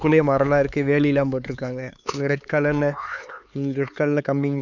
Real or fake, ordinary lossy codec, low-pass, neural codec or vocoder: fake; none; 7.2 kHz; codec, 16 kHz, 4 kbps, X-Codec, HuBERT features, trained on LibriSpeech